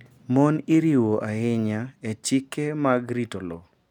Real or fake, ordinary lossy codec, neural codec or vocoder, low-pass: real; none; none; 19.8 kHz